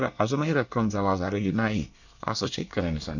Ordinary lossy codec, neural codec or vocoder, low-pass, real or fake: none; codec, 24 kHz, 1 kbps, SNAC; 7.2 kHz; fake